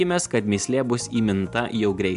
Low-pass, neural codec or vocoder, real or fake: 10.8 kHz; none; real